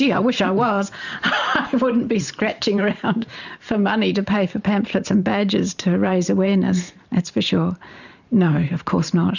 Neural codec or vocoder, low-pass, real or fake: vocoder, 44.1 kHz, 128 mel bands every 512 samples, BigVGAN v2; 7.2 kHz; fake